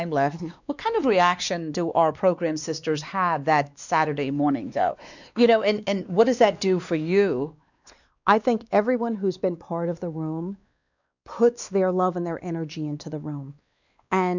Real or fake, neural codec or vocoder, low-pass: fake; codec, 16 kHz, 2 kbps, X-Codec, WavLM features, trained on Multilingual LibriSpeech; 7.2 kHz